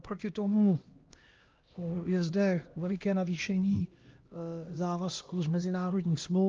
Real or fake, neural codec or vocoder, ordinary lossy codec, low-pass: fake; codec, 16 kHz, 1 kbps, X-Codec, HuBERT features, trained on LibriSpeech; Opus, 24 kbps; 7.2 kHz